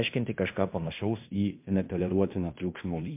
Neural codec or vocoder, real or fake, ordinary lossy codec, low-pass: codec, 16 kHz in and 24 kHz out, 0.9 kbps, LongCat-Audio-Codec, fine tuned four codebook decoder; fake; MP3, 32 kbps; 3.6 kHz